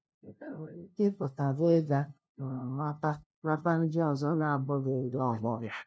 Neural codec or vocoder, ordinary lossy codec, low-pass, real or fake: codec, 16 kHz, 0.5 kbps, FunCodec, trained on LibriTTS, 25 frames a second; none; none; fake